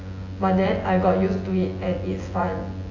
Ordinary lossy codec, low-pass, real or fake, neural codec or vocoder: AAC, 32 kbps; 7.2 kHz; fake; vocoder, 24 kHz, 100 mel bands, Vocos